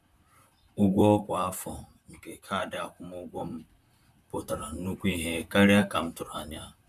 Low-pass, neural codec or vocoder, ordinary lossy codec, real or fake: 14.4 kHz; vocoder, 44.1 kHz, 128 mel bands, Pupu-Vocoder; none; fake